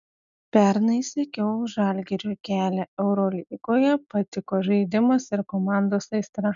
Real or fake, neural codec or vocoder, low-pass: real; none; 7.2 kHz